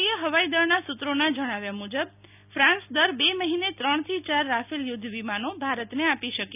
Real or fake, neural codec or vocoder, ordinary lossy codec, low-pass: real; none; none; 3.6 kHz